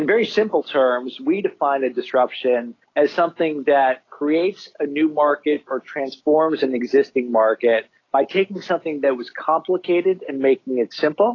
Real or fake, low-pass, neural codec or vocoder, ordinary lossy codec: real; 7.2 kHz; none; AAC, 32 kbps